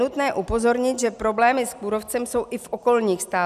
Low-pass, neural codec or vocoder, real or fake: 14.4 kHz; none; real